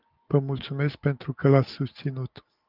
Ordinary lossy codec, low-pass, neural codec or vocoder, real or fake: Opus, 24 kbps; 5.4 kHz; none; real